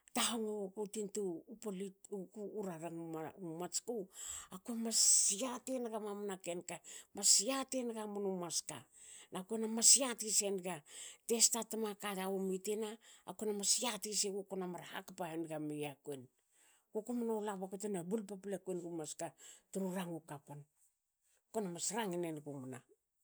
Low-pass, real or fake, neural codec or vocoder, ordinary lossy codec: none; real; none; none